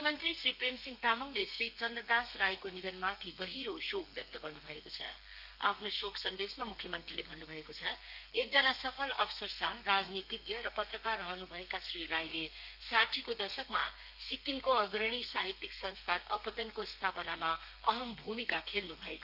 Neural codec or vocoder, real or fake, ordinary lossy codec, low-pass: codec, 32 kHz, 1.9 kbps, SNAC; fake; none; 5.4 kHz